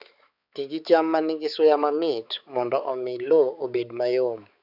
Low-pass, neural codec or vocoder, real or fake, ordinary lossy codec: 5.4 kHz; codec, 16 kHz, 4 kbps, X-Codec, HuBERT features, trained on balanced general audio; fake; none